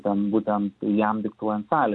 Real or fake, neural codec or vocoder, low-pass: real; none; 10.8 kHz